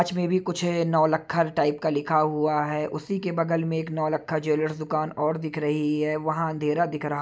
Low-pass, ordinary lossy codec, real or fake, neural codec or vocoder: none; none; real; none